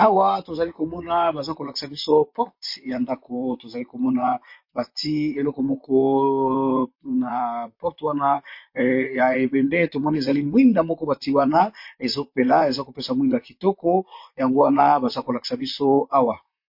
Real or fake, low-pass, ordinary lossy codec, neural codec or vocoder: fake; 5.4 kHz; MP3, 32 kbps; vocoder, 44.1 kHz, 128 mel bands, Pupu-Vocoder